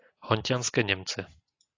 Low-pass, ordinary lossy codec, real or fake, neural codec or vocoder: 7.2 kHz; AAC, 32 kbps; real; none